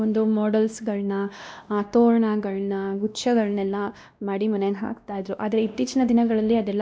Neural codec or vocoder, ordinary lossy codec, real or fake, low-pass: codec, 16 kHz, 1 kbps, X-Codec, WavLM features, trained on Multilingual LibriSpeech; none; fake; none